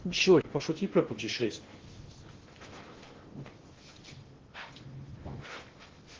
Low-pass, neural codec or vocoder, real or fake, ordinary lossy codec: 7.2 kHz; codec, 16 kHz in and 24 kHz out, 0.6 kbps, FocalCodec, streaming, 2048 codes; fake; Opus, 16 kbps